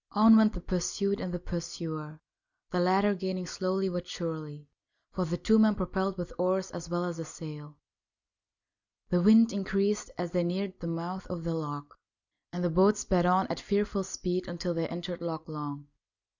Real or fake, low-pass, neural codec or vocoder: real; 7.2 kHz; none